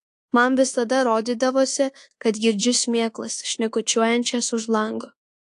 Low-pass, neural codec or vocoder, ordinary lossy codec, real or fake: 10.8 kHz; codec, 24 kHz, 1.2 kbps, DualCodec; AAC, 48 kbps; fake